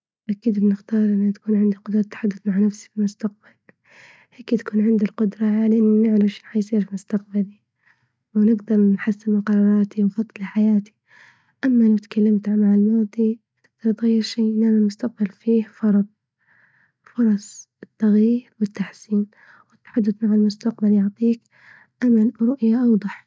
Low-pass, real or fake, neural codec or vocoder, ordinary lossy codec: none; real; none; none